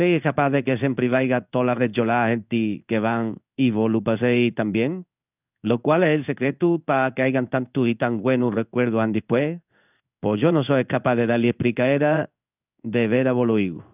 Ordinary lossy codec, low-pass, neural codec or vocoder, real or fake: none; 3.6 kHz; codec, 16 kHz in and 24 kHz out, 1 kbps, XY-Tokenizer; fake